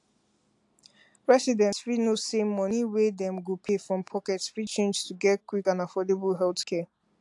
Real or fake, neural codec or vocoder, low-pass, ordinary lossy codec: real; none; 10.8 kHz; AAC, 64 kbps